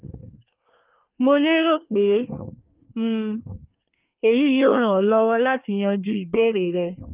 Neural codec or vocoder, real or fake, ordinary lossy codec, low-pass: codec, 24 kHz, 1 kbps, SNAC; fake; Opus, 24 kbps; 3.6 kHz